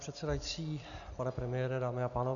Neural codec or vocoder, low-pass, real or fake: none; 7.2 kHz; real